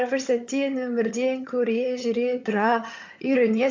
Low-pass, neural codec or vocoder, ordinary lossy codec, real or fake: 7.2 kHz; vocoder, 22.05 kHz, 80 mel bands, HiFi-GAN; MP3, 64 kbps; fake